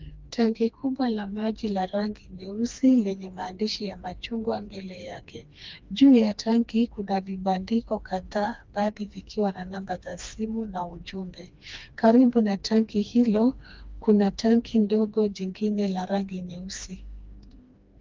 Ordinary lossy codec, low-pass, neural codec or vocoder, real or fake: Opus, 24 kbps; 7.2 kHz; codec, 16 kHz, 2 kbps, FreqCodec, smaller model; fake